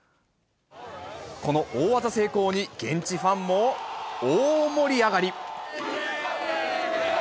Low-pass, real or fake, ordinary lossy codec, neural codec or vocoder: none; real; none; none